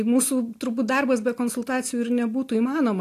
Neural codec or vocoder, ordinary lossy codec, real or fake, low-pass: none; AAC, 64 kbps; real; 14.4 kHz